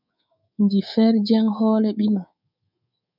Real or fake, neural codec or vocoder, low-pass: fake; codec, 24 kHz, 3.1 kbps, DualCodec; 5.4 kHz